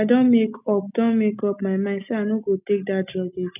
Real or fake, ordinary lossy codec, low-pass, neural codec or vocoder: real; none; 3.6 kHz; none